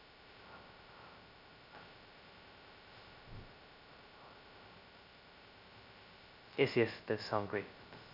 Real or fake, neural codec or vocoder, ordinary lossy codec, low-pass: fake; codec, 16 kHz, 0.2 kbps, FocalCodec; none; 5.4 kHz